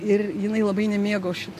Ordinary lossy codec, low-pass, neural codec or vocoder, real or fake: AAC, 64 kbps; 14.4 kHz; none; real